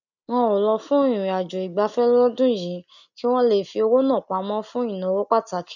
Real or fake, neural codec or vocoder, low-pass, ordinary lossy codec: real; none; 7.2 kHz; none